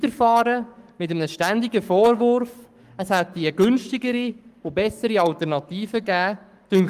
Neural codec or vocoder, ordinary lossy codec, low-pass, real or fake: codec, 44.1 kHz, 7.8 kbps, Pupu-Codec; Opus, 32 kbps; 14.4 kHz; fake